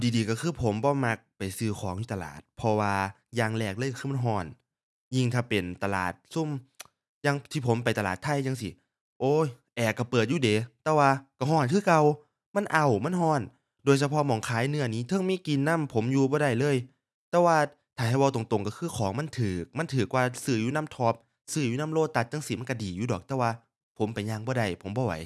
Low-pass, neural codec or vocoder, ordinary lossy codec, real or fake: none; none; none; real